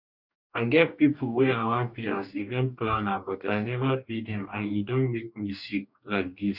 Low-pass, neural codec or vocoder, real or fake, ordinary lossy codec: 5.4 kHz; codec, 44.1 kHz, 2.6 kbps, DAC; fake; none